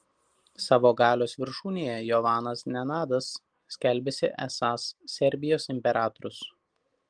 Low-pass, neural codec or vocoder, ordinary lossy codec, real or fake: 9.9 kHz; none; Opus, 24 kbps; real